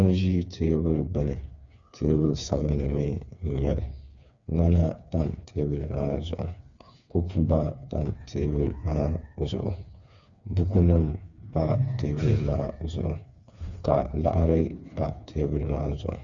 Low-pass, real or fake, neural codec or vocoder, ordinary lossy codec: 7.2 kHz; fake; codec, 16 kHz, 4 kbps, FreqCodec, smaller model; Opus, 64 kbps